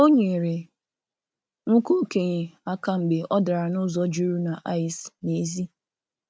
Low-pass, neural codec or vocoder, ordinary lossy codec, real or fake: none; none; none; real